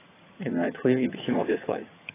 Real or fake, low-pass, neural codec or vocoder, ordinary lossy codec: fake; 3.6 kHz; vocoder, 22.05 kHz, 80 mel bands, HiFi-GAN; AAC, 16 kbps